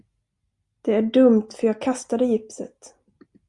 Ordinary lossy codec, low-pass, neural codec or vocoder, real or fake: Opus, 64 kbps; 10.8 kHz; none; real